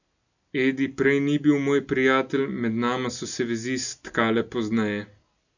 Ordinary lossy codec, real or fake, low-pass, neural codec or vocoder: none; real; 7.2 kHz; none